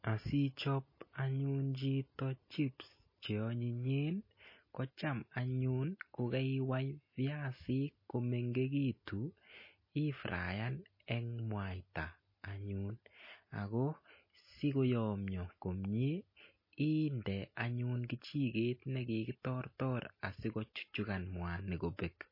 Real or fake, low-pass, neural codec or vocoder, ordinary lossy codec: real; 5.4 kHz; none; MP3, 24 kbps